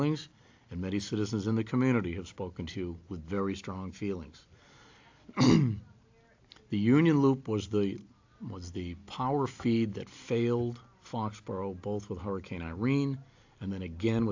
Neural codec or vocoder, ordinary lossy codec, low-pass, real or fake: none; AAC, 48 kbps; 7.2 kHz; real